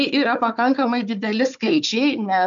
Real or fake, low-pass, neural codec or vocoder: fake; 7.2 kHz; codec, 16 kHz, 4 kbps, FunCodec, trained on Chinese and English, 50 frames a second